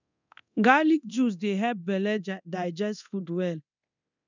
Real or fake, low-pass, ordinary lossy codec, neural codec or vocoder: fake; 7.2 kHz; none; codec, 24 kHz, 0.9 kbps, DualCodec